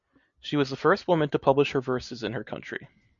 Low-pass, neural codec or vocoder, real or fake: 7.2 kHz; none; real